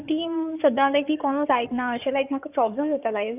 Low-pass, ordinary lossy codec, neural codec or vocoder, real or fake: 3.6 kHz; none; codec, 16 kHz in and 24 kHz out, 2.2 kbps, FireRedTTS-2 codec; fake